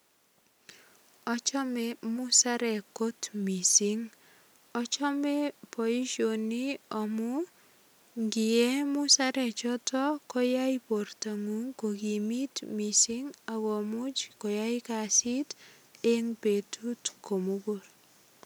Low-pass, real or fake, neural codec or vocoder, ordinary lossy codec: none; real; none; none